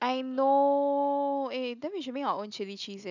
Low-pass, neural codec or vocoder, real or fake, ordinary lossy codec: 7.2 kHz; none; real; none